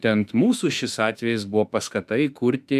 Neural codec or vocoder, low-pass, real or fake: autoencoder, 48 kHz, 32 numbers a frame, DAC-VAE, trained on Japanese speech; 14.4 kHz; fake